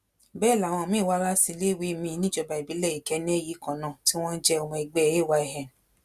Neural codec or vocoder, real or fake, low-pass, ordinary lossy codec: none; real; 14.4 kHz; Opus, 64 kbps